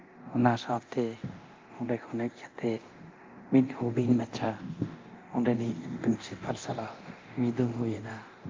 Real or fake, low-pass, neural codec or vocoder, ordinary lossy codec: fake; 7.2 kHz; codec, 24 kHz, 0.9 kbps, DualCodec; Opus, 24 kbps